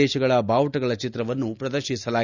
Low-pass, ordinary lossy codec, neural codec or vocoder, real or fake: 7.2 kHz; none; none; real